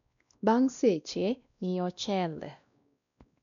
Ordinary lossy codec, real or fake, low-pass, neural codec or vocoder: none; fake; 7.2 kHz; codec, 16 kHz, 1 kbps, X-Codec, WavLM features, trained on Multilingual LibriSpeech